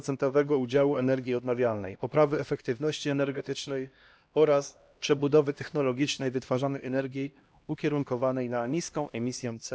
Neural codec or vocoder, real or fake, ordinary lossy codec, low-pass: codec, 16 kHz, 1 kbps, X-Codec, HuBERT features, trained on LibriSpeech; fake; none; none